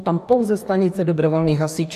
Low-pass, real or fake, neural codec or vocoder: 14.4 kHz; fake; codec, 44.1 kHz, 2.6 kbps, DAC